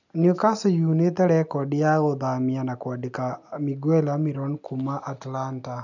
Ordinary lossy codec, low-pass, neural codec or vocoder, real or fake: none; 7.2 kHz; none; real